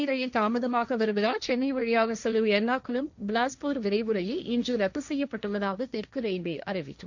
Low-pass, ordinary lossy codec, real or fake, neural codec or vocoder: 7.2 kHz; none; fake; codec, 16 kHz, 1.1 kbps, Voila-Tokenizer